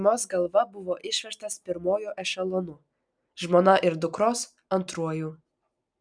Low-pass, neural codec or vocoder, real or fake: 9.9 kHz; none; real